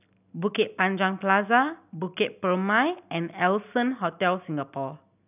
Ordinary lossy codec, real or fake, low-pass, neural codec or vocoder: none; real; 3.6 kHz; none